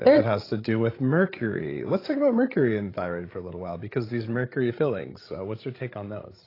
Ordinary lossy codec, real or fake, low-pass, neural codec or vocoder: AAC, 24 kbps; fake; 5.4 kHz; codec, 16 kHz, 16 kbps, FreqCodec, larger model